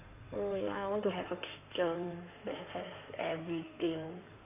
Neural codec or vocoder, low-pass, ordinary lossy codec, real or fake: codec, 16 kHz in and 24 kHz out, 2.2 kbps, FireRedTTS-2 codec; 3.6 kHz; none; fake